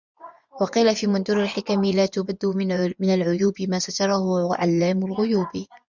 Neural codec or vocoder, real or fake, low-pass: vocoder, 24 kHz, 100 mel bands, Vocos; fake; 7.2 kHz